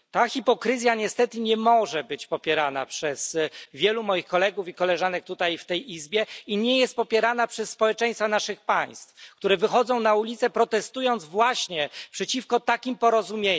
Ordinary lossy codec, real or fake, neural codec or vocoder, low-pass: none; real; none; none